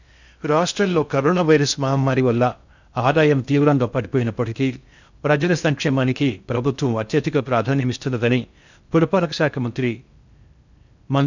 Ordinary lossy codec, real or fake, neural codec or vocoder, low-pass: none; fake; codec, 16 kHz in and 24 kHz out, 0.6 kbps, FocalCodec, streaming, 2048 codes; 7.2 kHz